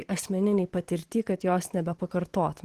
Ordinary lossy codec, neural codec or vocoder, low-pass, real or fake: Opus, 16 kbps; vocoder, 44.1 kHz, 128 mel bands every 512 samples, BigVGAN v2; 14.4 kHz; fake